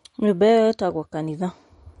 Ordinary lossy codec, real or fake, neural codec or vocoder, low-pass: MP3, 48 kbps; real; none; 19.8 kHz